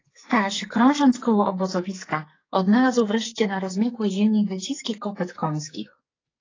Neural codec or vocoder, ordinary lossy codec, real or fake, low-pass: codec, 44.1 kHz, 2.6 kbps, SNAC; AAC, 32 kbps; fake; 7.2 kHz